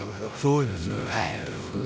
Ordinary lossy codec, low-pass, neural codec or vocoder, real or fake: none; none; codec, 16 kHz, 0.5 kbps, X-Codec, WavLM features, trained on Multilingual LibriSpeech; fake